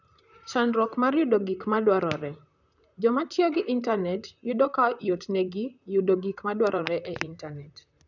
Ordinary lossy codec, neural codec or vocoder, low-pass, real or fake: none; vocoder, 44.1 kHz, 128 mel bands, Pupu-Vocoder; 7.2 kHz; fake